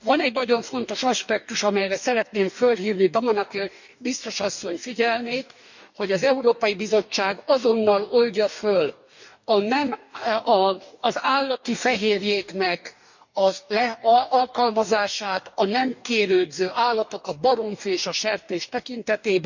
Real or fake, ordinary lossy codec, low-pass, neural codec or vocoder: fake; none; 7.2 kHz; codec, 44.1 kHz, 2.6 kbps, DAC